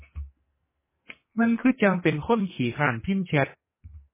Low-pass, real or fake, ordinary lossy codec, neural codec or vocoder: 3.6 kHz; fake; MP3, 16 kbps; codec, 44.1 kHz, 2.6 kbps, SNAC